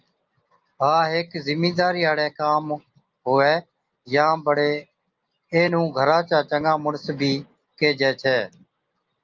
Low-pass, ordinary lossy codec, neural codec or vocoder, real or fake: 7.2 kHz; Opus, 24 kbps; none; real